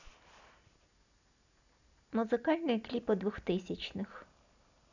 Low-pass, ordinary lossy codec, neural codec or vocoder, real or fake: 7.2 kHz; none; none; real